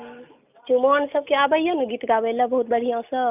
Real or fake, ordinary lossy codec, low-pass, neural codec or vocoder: real; none; 3.6 kHz; none